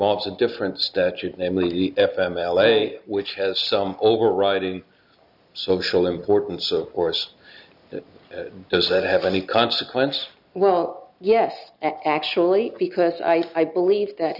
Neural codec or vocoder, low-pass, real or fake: none; 5.4 kHz; real